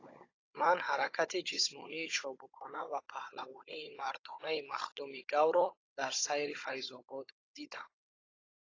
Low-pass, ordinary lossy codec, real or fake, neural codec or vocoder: 7.2 kHz; AAC, 32 kbps; fake; codec, 16 kHz, 16 kbps, FunCodec, trained on Chinese and English, 50 frames a second